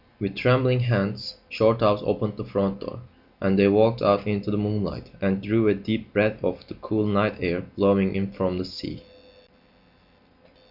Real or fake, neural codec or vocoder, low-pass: real; none; 5.4 kHz